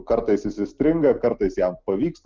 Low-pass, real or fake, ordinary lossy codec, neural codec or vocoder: 7.2 kHz; real; Opus, 24 kbps; none